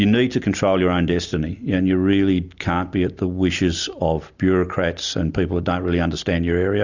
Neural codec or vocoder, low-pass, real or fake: none; 7.2 kHz; real